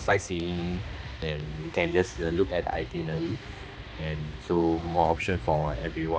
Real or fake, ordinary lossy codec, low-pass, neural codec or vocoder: fake; none; none; codec, 16 kHz, 2 kbps, X-Codec, HuBERT features, trained on balanced general audio